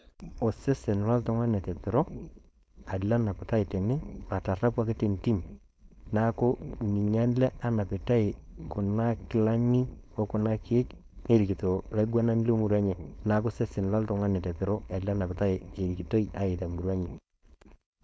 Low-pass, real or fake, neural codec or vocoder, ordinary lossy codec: none; fake; codec, 16 kHz, 4.8 kbps, FACodec; none